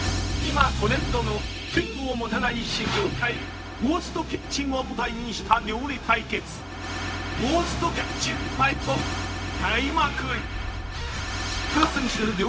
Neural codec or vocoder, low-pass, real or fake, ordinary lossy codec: codec, 16 kHz, 0.4 kbps, LongCat-Audio-Codec; none; fake; none